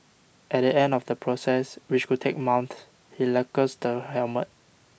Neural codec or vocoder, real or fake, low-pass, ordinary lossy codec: none; real; none; none